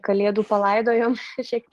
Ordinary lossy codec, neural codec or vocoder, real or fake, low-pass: Opus, 24 kbps; none; real; 14.4 kHz